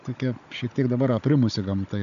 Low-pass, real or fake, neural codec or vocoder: 7.2 kHz; fake; codec, 16 kHz, 16 kbps, FunCodec, trained on Chinese and English, 50 frames a second